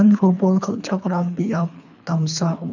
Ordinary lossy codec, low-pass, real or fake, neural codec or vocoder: none; 7.2 kHz; fake; codec, 24 kHz, 3 kbps, HILCodec